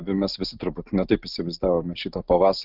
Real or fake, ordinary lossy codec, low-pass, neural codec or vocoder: real; Opus, 24 kbps; 5.4 kHz; none